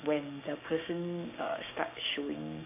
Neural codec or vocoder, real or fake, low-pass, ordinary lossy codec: codec, 16 kHz, 6 kbps, DAC; fake; 3.6 kHz; AAC, 24 kbps